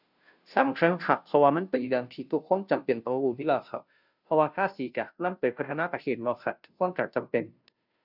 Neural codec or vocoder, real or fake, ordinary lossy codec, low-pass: codec, 16 kHz, 0.5 kbps, FunCodec, trained on Chinese and English, 25 frames a second; fake; none; 5.4 kHz